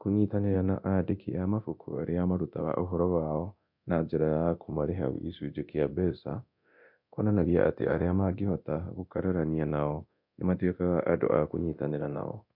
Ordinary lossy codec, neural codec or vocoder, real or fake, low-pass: MP3, 48 kbps; codec, 24 kHz, 0.9 kbps, DualCodec; fake; 5.4 kHz